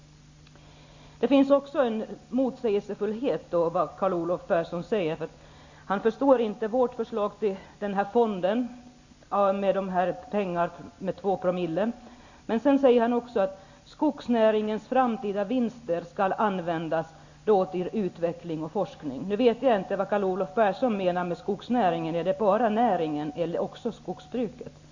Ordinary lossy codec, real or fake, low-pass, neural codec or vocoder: Opus, 64 kbps; real; 7.2 kHz; none